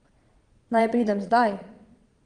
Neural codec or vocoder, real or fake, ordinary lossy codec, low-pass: vocoder, 22.05 kHz, 80 mel bands, Vocos; fake; Opus, 32 kbps; 9.9 kHz